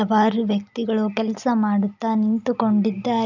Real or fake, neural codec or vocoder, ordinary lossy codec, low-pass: real; none; none; 7.2 kHz